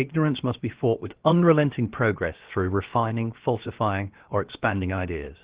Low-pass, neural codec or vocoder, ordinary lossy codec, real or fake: 3.6 kHz; codec, 16 kHz, 0.7 kbps, FocalCodec; Opus, 16 kbps; fake